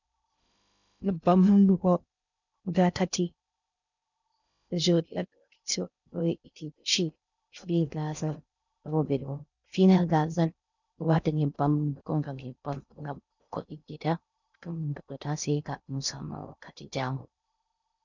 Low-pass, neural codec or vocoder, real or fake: 7.2 kHz; codec, 16 kHz in and 24 kHz out, 0.6 kbps, FocalCodec, streaming, 2048 codes; fake